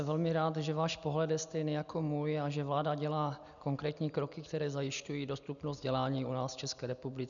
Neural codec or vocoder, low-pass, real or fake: none; 7.2 kHz; real